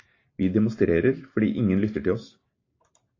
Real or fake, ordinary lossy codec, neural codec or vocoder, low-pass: real; AAC, 32 kbps; none; 7.2 kHz